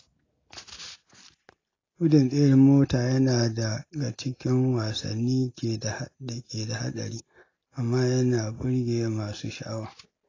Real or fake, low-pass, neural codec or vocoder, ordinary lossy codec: real; 7.2 kHz; none; AAC, 32 kbps